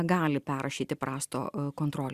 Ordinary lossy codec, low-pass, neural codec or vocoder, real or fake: Opus, 64 kbps; 14.4 kHz; none; real